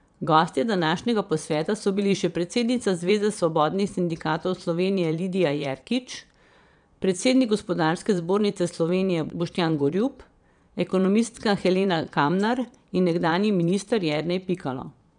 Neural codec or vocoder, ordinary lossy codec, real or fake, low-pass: vocoder, 22.05 kHz, 80 mel bands, Vocos; none; fake; 9.9 kHz